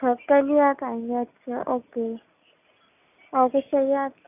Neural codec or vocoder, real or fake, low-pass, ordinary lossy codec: none; real; 3.6 kHz; none